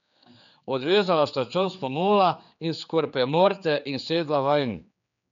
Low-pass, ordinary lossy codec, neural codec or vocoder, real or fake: 7.2 kHz; none; codec, 16 kHz, 4 kbps, X-Codec, HuBERT features, trained on general audio; fake